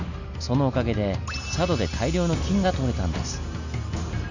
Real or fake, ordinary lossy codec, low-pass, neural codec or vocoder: real; none; 7.2 kHz; none